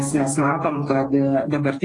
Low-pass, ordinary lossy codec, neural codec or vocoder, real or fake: 10.8 kHz; AAC, 32 kbps; codec, 44.1 kHz, 2.6 kbps, DAC; fake